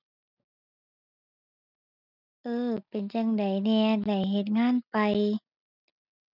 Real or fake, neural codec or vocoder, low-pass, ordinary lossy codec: real; none; 5.4 kHz; none